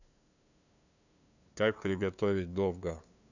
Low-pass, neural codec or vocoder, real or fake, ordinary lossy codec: 7.2 kHz; codec, 16 kHz, 2 kbps, FunCodec, trained on LibriTTS, 25 frames a second; fake; none